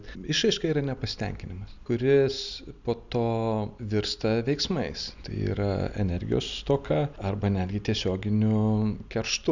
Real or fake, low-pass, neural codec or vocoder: real; 7.2 kHz; none